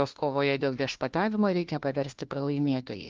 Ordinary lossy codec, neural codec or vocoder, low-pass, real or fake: Opus, 32 kbps; codec, 16 kHz, 1 kbps, FunCodec, trained on LibriTTS, 50 frames a second; 7.2 kHz; fake